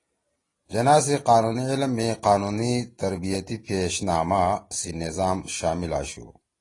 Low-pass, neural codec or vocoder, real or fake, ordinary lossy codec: 10.8 kHz; none; real; AAC, 32 kbps